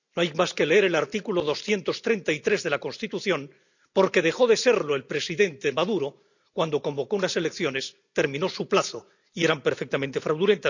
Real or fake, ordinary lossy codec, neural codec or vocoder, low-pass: real; none; none; 7.2 kHz